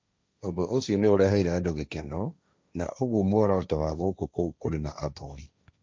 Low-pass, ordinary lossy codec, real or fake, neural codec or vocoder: none; none; fake; codec, 16 kHz, 1.1 kbps, Voila-Tokenizer